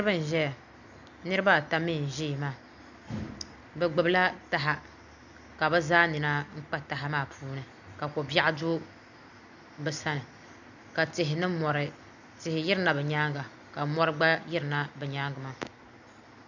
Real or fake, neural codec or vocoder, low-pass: real; none; 7.2 kHz